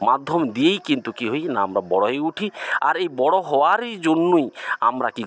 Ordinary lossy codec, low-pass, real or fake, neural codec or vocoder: none; none; real; none